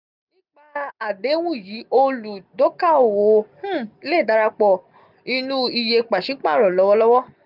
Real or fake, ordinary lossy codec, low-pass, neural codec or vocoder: real; none; 5.4 kHz; none